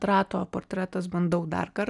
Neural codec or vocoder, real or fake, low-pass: none; real; 10.8 kHz